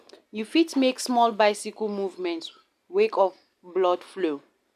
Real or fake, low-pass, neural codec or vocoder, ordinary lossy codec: real; 14.4 kHz; none; none